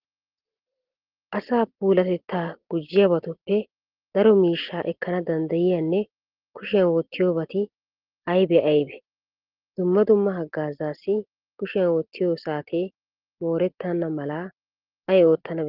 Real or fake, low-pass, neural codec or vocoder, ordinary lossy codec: real; 5.4 kHz; none; Opus, 16 kbps